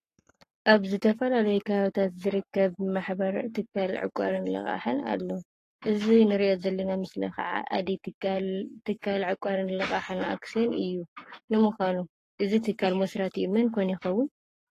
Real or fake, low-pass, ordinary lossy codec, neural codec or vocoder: fake; 14.4 kHz; AAC, 48 kbps; codec, 44.1 kHz, 7.8 kbps, Pupu-Codec